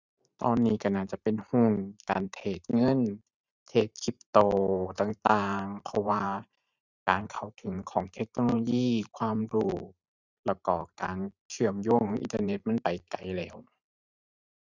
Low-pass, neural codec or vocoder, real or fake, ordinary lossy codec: 7.2 kHz; vocoder, 44.1 kHz, 128 mel bands every 512 samples, BigVGAN v2; fake; none